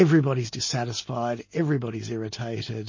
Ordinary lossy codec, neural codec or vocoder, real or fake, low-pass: MP3, 32 kbps; none; real; 7.2 kHz